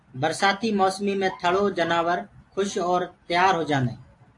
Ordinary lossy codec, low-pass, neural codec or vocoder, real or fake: AAC, 48 kbps; 10.8 kHz; none; real